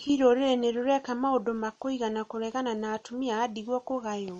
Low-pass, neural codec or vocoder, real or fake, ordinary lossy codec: 10.8 kHz; none; real; MP3, 48 kbps